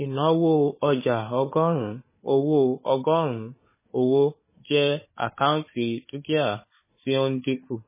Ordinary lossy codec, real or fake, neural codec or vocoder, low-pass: MP3, 16 kbps; fake; codec, 16 kHz, 4 kbps, FunCodec, trained on Chinese and English, 50 frames a second; 3.6 kHz